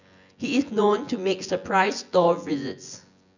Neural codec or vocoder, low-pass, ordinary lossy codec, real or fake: vocoder, 24 kHz, 100 mel bands, Vocos; 7.2 kHz; none; fake